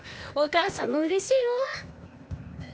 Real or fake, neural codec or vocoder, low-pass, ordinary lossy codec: fake; codec, 16 kHz, 0.8 kbps, ZipCodec; none; none